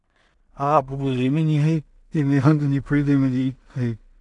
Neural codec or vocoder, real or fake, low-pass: codec, 16 kHz in and 24 kHz out, 0.4 kbps, LongCat-Audio-Codec, two codebook decoder; fake; 10.8 kHz